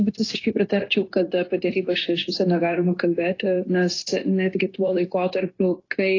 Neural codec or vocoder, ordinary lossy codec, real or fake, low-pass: codec, 16 kHz, 0.9 kbps, LongCat-Audio-Codec; AAC, 32 kbps; fake; 7.2 kHz